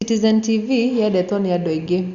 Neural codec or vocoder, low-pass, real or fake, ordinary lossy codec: none; 7.2 kHz; real; Opus, 64 kbps